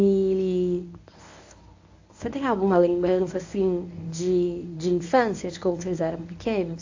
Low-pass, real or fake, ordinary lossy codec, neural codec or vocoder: 7.2 kHz; fake; AAC, 48 kbps; codec, 24 kHz, 0.9 kbps, WavTokenizer, medium speech release version 1